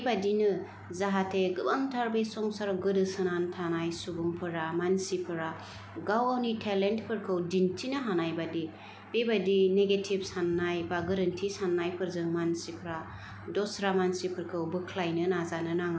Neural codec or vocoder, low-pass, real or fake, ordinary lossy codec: none; none; real; none